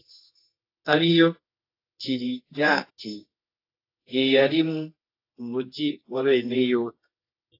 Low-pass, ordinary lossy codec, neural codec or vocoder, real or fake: 5.4 kHz; AAC, 32 kbps; codec, 24 kHz, 0.9 kbps, WavTokenizer, medium music audio release; fake